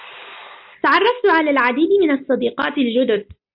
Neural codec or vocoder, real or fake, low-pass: none; real; 5.4 kHz